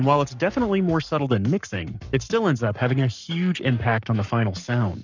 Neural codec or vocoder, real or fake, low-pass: codec, 44.1 kHz, 7.8 kbps, Pupu-Codec; fake; 7.2 kHz